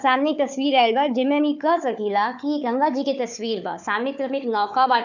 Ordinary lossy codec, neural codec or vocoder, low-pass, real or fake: none; codec, 16 kHz, 4 kbps, FunCodec, trained on Chinese and English, 50 frames a second; 7.2 kHz; fake